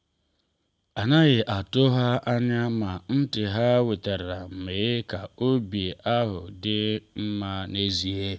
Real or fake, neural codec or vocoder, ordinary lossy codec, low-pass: real; none; none; none